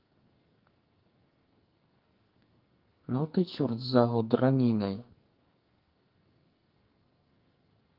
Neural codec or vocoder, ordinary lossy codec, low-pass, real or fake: codec, 44.1 kHz, 2.6 kbps, SNAC; Opus, 24 kbps; 5.4 kHz; fake